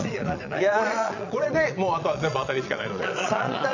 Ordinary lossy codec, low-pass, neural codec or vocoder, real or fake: none; 7.2 kHz; vocoder, 22.05 kHz, 80 mel bands, Vocos; fake